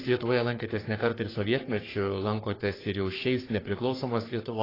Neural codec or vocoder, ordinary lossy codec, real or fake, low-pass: codec, 44.1 kHz, 3.4 kbps, Pupu-Codec; AAC, 24 kbps; fake; 5.4 kHz